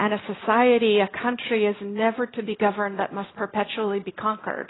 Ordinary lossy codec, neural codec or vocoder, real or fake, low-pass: AAC, 16 kbps; none; real; 7.2 kHz